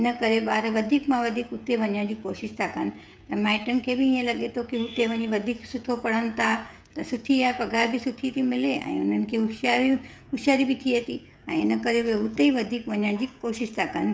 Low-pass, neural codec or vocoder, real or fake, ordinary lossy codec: none; codec, 16 kHz, 16 kbps, FreqCodec, smaller model; fake; none